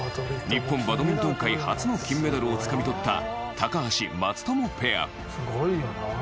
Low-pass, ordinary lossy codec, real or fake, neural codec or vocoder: none; none; real; none